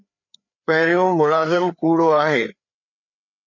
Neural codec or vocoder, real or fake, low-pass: codec, 16 kHz, 4 kbps, FreqCodec, larger model; fake; 7.2 kHz